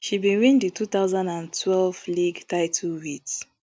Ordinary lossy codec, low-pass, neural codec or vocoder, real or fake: none; none; none; real